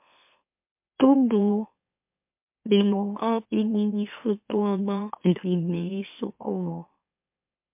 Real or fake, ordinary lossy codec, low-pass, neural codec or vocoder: fake; MP3, 32 kbps; 3.6 kHz; autoencoder, 44.1 kHz, a latent of 192 numbers a frame, MeloTTS